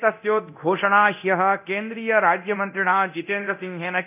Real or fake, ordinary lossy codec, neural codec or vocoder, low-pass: fake; none; codec, 24 kHz, 0.9 kbps, DualCodec; 3.6 kHz